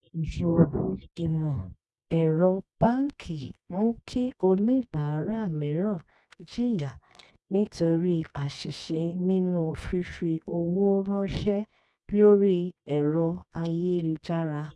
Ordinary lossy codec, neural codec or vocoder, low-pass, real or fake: none; codec, 24 kHz, 0.9 kbps, WavTokenizer, medium music audio release; none; fake